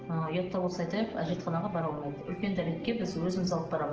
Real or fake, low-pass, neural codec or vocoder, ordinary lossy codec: real; 7.2 kHz; none; Opus, 16 kbps